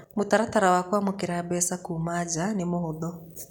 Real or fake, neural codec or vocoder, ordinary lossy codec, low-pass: real; none; none; none